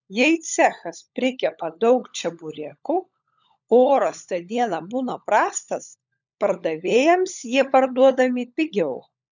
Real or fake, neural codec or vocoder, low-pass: fake; codec, 16 kHz, 16 kbps, FunCodec, trained on LibriTTS, 50 frames a second; 7.2 kHz